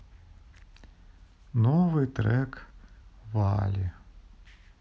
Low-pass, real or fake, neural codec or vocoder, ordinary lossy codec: none; real; none; none